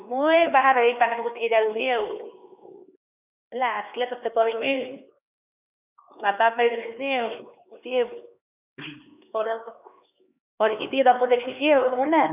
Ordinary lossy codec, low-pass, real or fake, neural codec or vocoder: none; 3.6 kHz; fake; codec, 16 kHz, 2 kbps, X-Codec, HuBERT features, trained on LibriSpeech